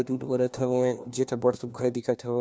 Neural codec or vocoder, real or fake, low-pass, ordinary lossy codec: codec, 16 kHz, 1 kbps, FunCodec, trained on LibriTTS, 50 frames a second; fake; none; none